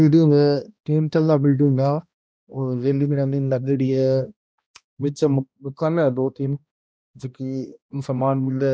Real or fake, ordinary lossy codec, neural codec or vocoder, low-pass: fake; none; codec, 16 kHz, 1 kbps, X-Codec, HuBERT features, trained on balanced general audio; none